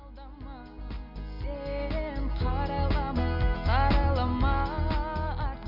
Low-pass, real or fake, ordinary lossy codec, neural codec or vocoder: 5.4 kHz; real; none; none